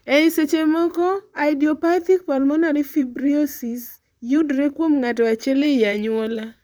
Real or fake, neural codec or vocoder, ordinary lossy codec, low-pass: fake; vocoder, 44.1 kHz, 128 mel bands, Pupu-Vocoder; none; none